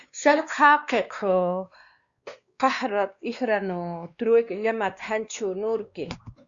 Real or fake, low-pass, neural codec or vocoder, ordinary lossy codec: fake; 7.2 kHz; codec, 16 kHz, 2 kbps, X-Codec, WavLM features, trained on Multilingual LibriSpeech; Opus, 64 kbps